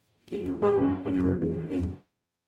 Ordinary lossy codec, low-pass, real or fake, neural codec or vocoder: MP3, 64 kbps; 19.8 kHz; fake; codec, 44.1 kHz, 0.9 kbps, DAC